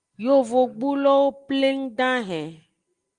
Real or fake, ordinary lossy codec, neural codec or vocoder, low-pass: real; Opus, 24 kbps; none; 9.9 kHz